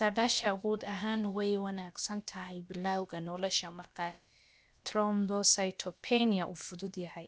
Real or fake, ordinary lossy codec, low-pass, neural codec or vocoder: fake; none; none; codec, 16 kHz, about 1 kbps, DyCAST, with the encoder's durations